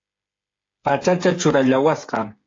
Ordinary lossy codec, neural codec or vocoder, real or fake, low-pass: AAC, 32 kbps; codec, 16 kHz, 8 kbps, FreqCodec, smaller model; fake; 7.2 kHz